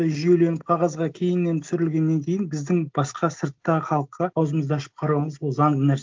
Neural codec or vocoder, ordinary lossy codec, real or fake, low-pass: none; Opus, 24 kbps; real; 7.2 kHz